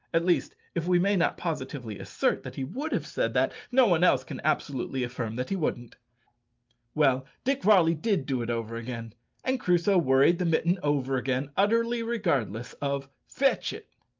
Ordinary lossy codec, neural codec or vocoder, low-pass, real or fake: Opus, 24 kbps; none; 7.2 kHz; real